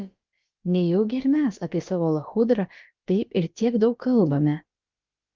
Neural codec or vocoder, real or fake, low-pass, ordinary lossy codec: codec, 16 kHz, about 1 kbps, DyCAST, with the encoder's durations; fake; 7.2 kHz; Opus, 24 kbps